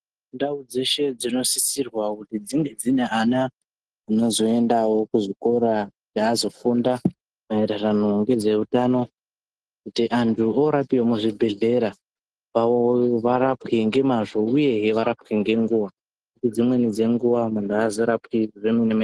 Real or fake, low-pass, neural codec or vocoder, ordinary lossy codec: real; 10.8 kHz; none; Opus, 16 kbps